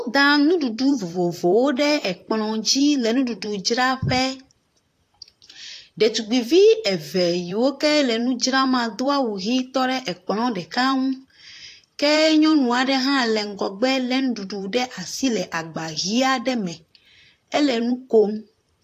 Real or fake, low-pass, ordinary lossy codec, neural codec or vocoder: fake; 14.4 kHz; AAC, 64 kbps; vocoder, 44.1 kHz, 128 mel bands, Pupu-Vocoder